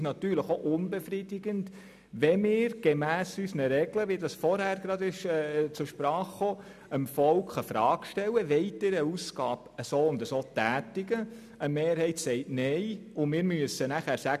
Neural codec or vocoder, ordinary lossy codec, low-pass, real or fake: none; none; 14.4 kHz; real